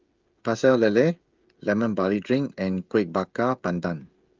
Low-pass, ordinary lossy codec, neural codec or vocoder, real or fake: 7.2 kHz; Opus, 24 kbps; codec, 16 kHz, 16 kbps, FreqCodec, smaller model; fake